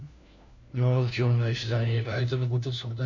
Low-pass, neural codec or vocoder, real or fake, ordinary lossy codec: 7.2 kHz; codec, 16 kHz, 1 kbps, FunCodec, trained on LibriTTS, 50 frames a second; fake; none